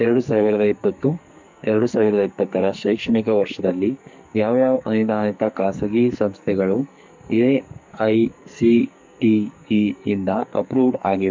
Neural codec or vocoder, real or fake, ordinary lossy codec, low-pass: codec, 44.1 kHz, 2.6 kbps, SNAC; fake; MP3, 64 kbps; 7.2 kHz